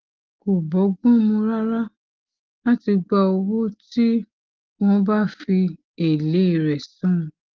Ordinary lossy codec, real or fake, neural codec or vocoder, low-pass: Opus, 16 kbps; real; none; 7.2 kHz